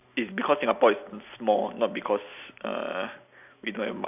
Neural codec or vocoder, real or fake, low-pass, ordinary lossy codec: none; real; 3.6 kHz; none